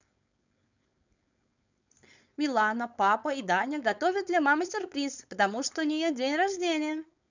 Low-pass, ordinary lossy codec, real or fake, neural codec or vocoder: 7.2 kHz; none; fake; codec, 16 kHz, 4.8 kbps, FACodec